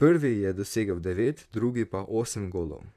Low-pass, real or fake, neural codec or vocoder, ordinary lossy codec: 14.4 kHz; fake; vocoder, 44.1 kHz, 128 mel bands, Pupu-Vocoder; none